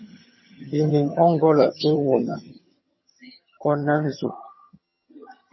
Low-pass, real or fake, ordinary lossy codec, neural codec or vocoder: 7.2 kHz; fake; MP3, 24 kbps; vocoder, 22.05 kHz, 80 mel bands, HiFi-GAN